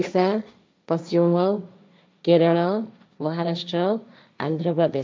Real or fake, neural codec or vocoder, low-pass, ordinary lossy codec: fake; codec, 16 kHz, 1.1 kbps, Voila-Tokenizer; none; none